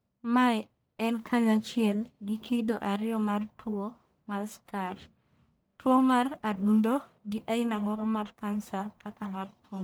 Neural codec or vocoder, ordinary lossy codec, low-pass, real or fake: codec, 44.1 kHz, 1.7 kbps, Pupu-Codec; none; none; fake